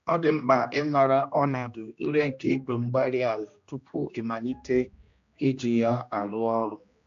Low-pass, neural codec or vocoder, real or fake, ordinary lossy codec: 7.2 kHz; codec, 16 kHz, 1 kbps, X-Codec, HuBERT features, trained on general audio; fake; AAC, 64 kbps